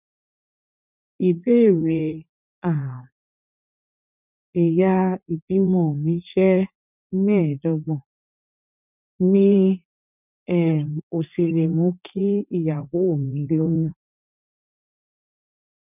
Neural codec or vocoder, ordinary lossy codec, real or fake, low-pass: vocoder, 44.1 kHz, 80 mel bands, Vocos; none; fake; 3.6 kHz